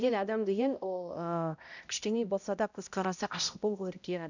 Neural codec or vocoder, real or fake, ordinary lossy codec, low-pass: codec, 16 kHz, 0.5 kbps, X-Codec, HuBERT features, trained on balanced general audio; fake; none; 7.2 kHz